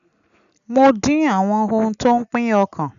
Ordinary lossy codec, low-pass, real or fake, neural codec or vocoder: none; 7.2 kHz; real; none